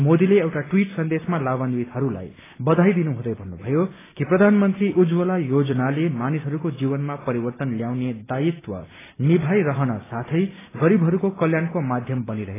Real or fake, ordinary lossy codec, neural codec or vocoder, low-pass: real; AAC, 16 kbps; none; 3.6 kHz